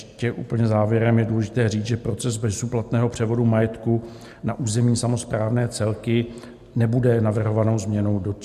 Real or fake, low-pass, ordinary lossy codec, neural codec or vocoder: real; 14.4 kHz; MP3, 64 kbps; none